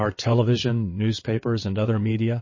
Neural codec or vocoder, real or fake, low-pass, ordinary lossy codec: vocoder, 22.05 kHz, 80 mel bands, WaveNeXt; fake; 7.2 kHz; MP3, 32 kbps